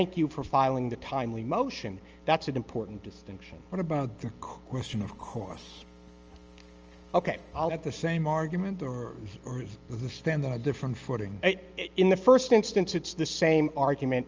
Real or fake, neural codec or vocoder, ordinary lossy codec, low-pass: real; none; Opus, 24 kbps; 7.2 kHz